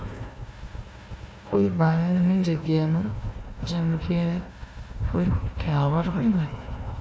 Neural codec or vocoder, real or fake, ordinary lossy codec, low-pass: codec, 16 kHz, 1 kbps, FunCodec, trained on Chinese and English, 50 frames a second; fake; none; none